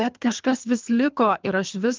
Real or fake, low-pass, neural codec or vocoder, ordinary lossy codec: fake; 7.2 kHz; codec, 24 kHz, 3 kbps, HILCodec; Opus, 24 kbps